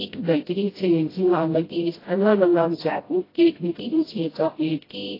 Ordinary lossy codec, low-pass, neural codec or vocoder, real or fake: AAC, 24 kbps; 5.4 kHz; codec, 16 kHz, 0.5 kbps, FreqCodec, smaller model; fake